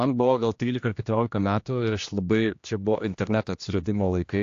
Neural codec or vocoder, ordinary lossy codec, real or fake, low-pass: codec, 16 kHz, 1 kbps, X-Codec, HuBERT features, trained on general audio; AAC, 48 kbps; fake; 7.2 kHz